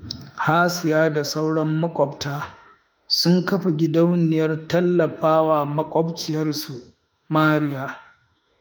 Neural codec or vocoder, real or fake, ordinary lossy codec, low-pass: autoencoder, 48 kHz, 32 numbers a frame, DAC-VAE, trained on Japanese speech; fake; none; none